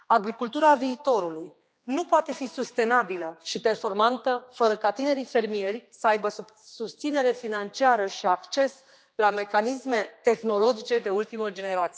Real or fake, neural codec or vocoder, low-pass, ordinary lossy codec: fake; codec, 16 kHz, 2 kbps, X-Codec, HuBERT features, trained on general audio; none; none